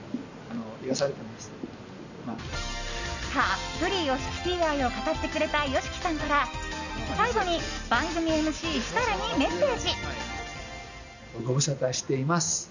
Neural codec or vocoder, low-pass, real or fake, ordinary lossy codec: none; 7.2 kHz; real; none